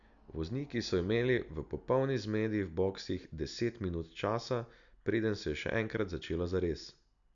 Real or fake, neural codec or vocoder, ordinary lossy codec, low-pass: real; none; none; 7.2 kHz